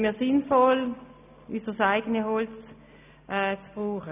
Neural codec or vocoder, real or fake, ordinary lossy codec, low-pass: none; real; none; 3.6 kHz